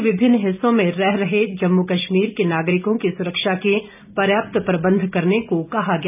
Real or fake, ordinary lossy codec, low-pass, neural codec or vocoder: real; none; 3.6 kHz; none